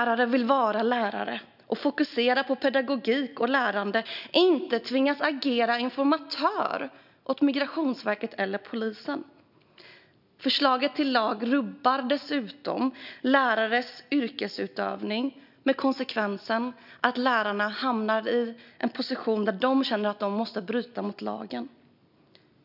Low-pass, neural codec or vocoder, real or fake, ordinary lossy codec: 5.4 kHz; none; real; none